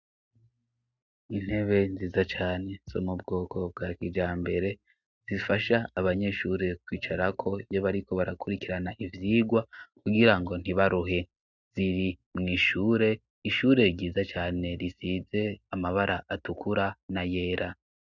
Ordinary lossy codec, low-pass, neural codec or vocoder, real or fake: Opus, 64 kbps; 7.2 kHz; none; real